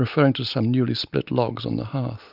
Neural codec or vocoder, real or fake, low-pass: none; real; 5.4 kHz